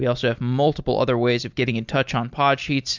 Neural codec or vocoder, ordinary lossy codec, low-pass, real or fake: none; MP3, 64 kbps; 7.2 kHz; real